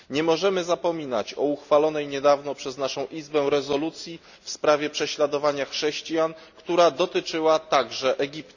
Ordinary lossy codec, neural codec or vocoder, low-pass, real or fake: none; none; 7.2 kHz; real